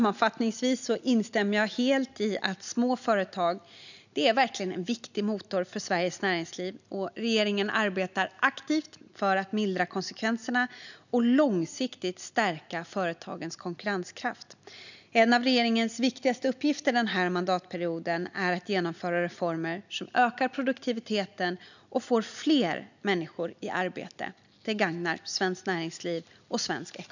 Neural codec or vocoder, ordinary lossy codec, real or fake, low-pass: none; none; real; 7.2 kHz